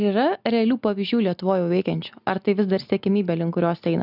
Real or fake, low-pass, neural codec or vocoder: real; 5.4 kHz; none